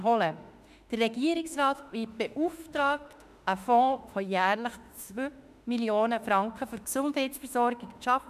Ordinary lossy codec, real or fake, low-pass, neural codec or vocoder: none; fake; 14.4 kHz; autoencoder, 48 kHz, 32 numbers a frame, DAC-VAE, trained on Japanese speech